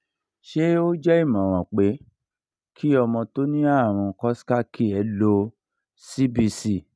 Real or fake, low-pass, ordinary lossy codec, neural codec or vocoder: real; none; none; none